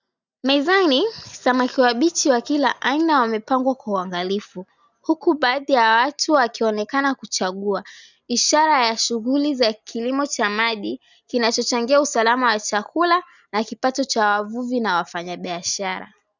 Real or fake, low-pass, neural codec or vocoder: real; 7.2 kHz; none